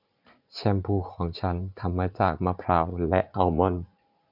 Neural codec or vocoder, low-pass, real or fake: vocoder, 44.1 kHz, 80 mel bands, Vocos; 5.4 kHz; fake